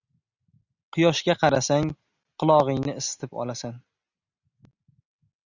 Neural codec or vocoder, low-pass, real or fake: none; 7.2 kHz; real